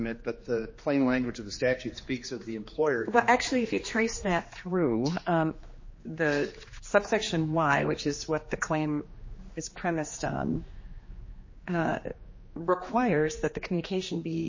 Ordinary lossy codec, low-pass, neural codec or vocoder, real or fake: MP3, 32 kbps; 7.2 kHz; codec, 16 kHz, 2 kbps, X-Codec, HuBERT features, trained on general audio; fake